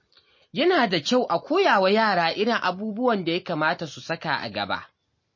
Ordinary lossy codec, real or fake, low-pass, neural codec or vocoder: MP3, 32 kbps; real; 7.2 kHz; none